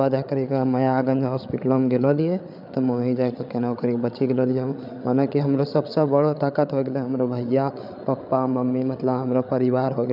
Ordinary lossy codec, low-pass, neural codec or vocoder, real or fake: none; 5.4 kHz; codec, 16 kHz, 8 kbps, FreqCodec, larger model; fake